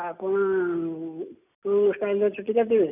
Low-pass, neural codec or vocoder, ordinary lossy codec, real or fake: 3.6 kHz; none; none; real